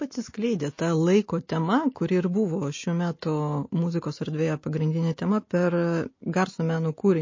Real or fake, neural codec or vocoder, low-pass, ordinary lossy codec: real; none; 7.2 kHz; MP3, 32 kbps